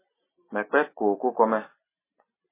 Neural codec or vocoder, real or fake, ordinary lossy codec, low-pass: none; real; MP3, 16 kbps; 3.6 kHz